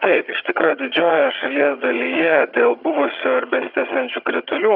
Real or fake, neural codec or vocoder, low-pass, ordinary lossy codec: fake; vocoder, 22.05 kHz, 80 mel bands, HiFi-GAN; 5.4 kHz; Opus, 64 kbps